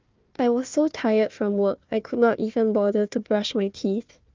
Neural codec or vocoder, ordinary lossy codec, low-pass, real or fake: codec, 16 kHz, 1 kbps, FunCodec, trained on Chinese and English, 50 frames a second; Opus, 24 kbps; 7.2 kHz; fake